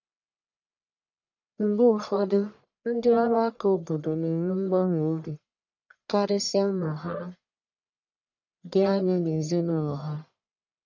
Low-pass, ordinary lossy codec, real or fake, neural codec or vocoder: 7.2 kHz; none; fake; codec, 44.1 kHz, 1.7 kbps, Pupu-Codec